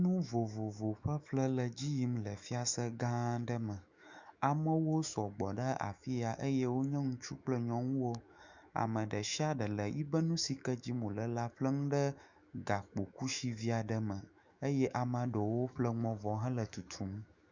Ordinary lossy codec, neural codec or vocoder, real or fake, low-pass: Opus, 64 kbps; autoencoder, 48 kHz, 128 numbers a frame, DAC-VAE, trained on Japanese speech; fake; 7.2 kHz